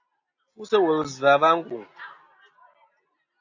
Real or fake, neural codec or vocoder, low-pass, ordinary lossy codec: real; none; 7.2 kHz; AAC, 48 kbps